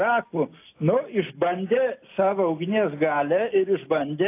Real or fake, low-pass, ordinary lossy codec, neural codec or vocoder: real; 3.6 kHz; AAC, 24 kbps; none